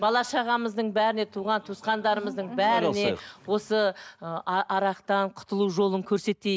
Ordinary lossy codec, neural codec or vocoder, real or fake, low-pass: none; none; real; none